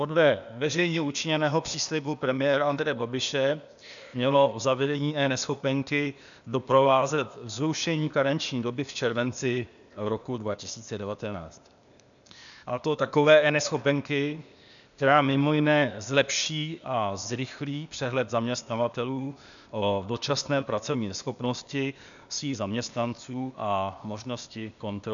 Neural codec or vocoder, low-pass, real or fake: codec, 16 kHz, 0.8 kbps, ZipCodec; 7.2 kHz; fake